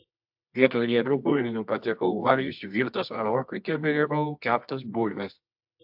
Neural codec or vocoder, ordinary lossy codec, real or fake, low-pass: codec, 24 kHz, 0.9 kbps, WavTokenizer, medium music audio release; AAC, 48 kbps; fake; 5.4 kHz